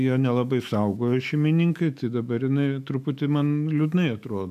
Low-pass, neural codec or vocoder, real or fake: 14.4 kHz; autoencoder, 48 kHz, 128 numbers a frame, DAC-VAE, trained on Japanese speech; fake